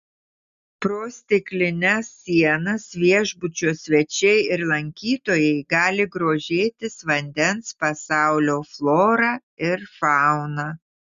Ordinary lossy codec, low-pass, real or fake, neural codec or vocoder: Opus, 64 kbps; 7.2 kHz; real; none